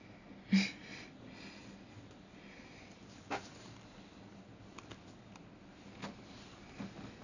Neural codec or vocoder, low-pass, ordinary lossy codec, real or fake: none; 7.2 kHz; none; real